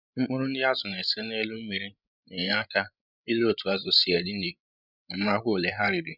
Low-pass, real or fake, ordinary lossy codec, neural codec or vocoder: 5.4 kHz; fake; none; codec, 16 kHz, 16 kbps, FreqCodec, larger model